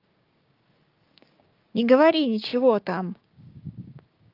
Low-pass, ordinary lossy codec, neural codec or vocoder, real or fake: 5.4 kHz; Opus, 32 kbps; codec, 16 kHz, 6 kbps, DAC; fake